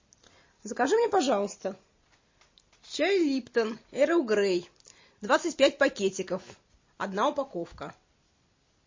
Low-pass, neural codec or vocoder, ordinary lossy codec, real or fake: 7.2 kHz; none; MP3, 32 kbps; real